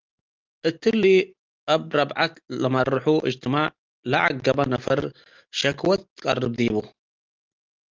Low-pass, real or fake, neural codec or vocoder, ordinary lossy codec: 7.2 kHz; real; none; Opus, 24 kbps